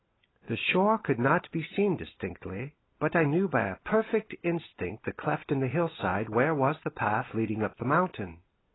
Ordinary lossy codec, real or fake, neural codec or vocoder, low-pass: AAC, 16 kbps; real; none; 7.2 kHz